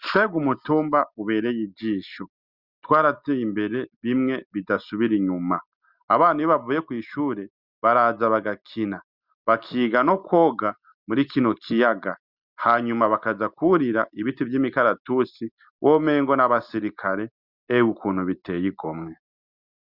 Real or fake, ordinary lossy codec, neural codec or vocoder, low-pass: real; Opus, 64 kbps; none; 5.4 kHz